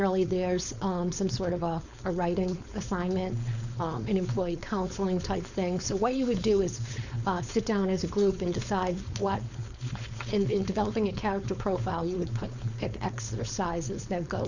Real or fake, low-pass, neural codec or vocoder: fake; 7.2 kHz; codec, 16 kHz, 4.8 kbps, FACodec